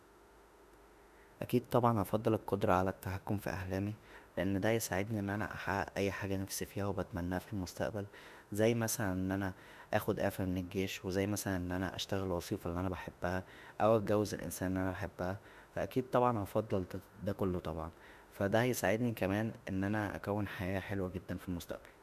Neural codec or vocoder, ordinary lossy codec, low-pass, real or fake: autoencoder, 48 kHz, 32 numbers a frame, DAC-VAE, trained on Japanese speech; none; 14.4 kHz; fake